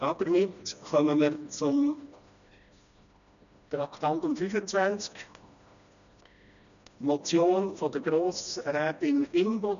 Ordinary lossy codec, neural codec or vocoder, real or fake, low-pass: none; codec, 16 kHz, 1 kbps, FreqCodec, smaller model; fake; 7.2 kHz